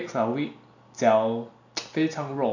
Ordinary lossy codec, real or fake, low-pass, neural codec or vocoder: none; real; 7.2 kHz; none